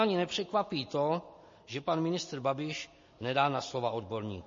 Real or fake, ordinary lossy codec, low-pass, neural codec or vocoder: real; MP3, 32 kbps; 7.2 kHz; none